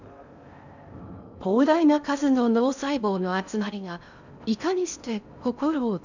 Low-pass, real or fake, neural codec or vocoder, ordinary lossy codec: 7.2 kHz; fake; codec, 16 kHz in and 24 kHz out, 0.6 kbps, FocalCodec, streaming, 4096 codes; none